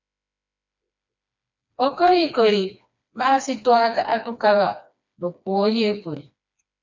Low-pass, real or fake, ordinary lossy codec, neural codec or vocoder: 7.2 kHz; fake; MP3, 64 kbps; codec, 16 kHz, 2 kbps, FreqCodec, smaller model